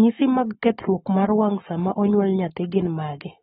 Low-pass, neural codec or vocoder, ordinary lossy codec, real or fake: 19.8 kHz; codec, 44.1 kHz, 7.8 kbps, Pupu-Codec; AAC, 16 kbps; fake